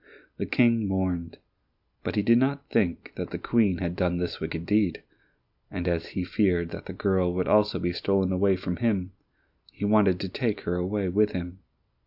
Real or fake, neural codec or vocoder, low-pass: real; none; 5.4 kHz